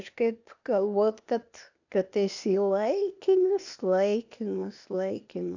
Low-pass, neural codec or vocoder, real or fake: 7.2 kHz; codec, 16 kHz, 0.8 kbps, ZipCodec; fake